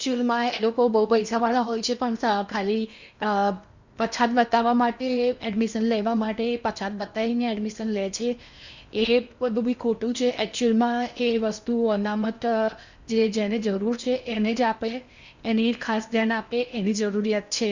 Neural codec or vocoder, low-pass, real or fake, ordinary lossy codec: codec, 16 kHz in and 24 kHz out, 0.8 kbps, FocalCodec, streaming, 65536 codes; 7.2 kHz; fake; Opus, 64 kbps